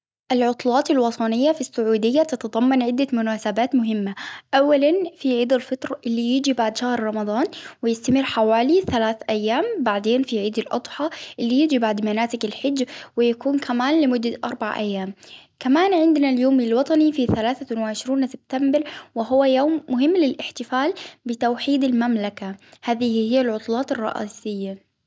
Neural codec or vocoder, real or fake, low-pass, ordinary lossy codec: none; real; none; none